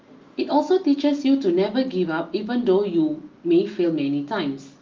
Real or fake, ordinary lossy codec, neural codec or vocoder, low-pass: real; Opus, 32 kbps; none; 7.2 kHz